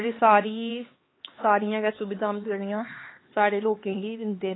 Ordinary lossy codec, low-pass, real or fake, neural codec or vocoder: AAC, 16 kbps; 7.2 kHz; fake; codec, 16 kHz, 4 kbps, X-Codec, HuBERT features, trained on LibriSpeech